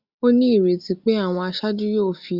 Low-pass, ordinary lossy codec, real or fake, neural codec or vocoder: 5.4 kHz; Opus, 64 kbps; real; none